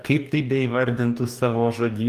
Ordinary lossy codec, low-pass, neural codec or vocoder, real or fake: Opus, 32 kbps; 14.4 kHz; codec, 44.1 kHz, 2.6 kbps, DAC; fake